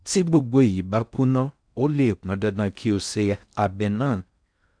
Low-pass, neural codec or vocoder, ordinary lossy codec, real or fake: 9.9 kHz; codec, 16 kHz in and 24 kHz out, 0.6 kbps, FocalCodec, streaming, 4096 codes; none; fake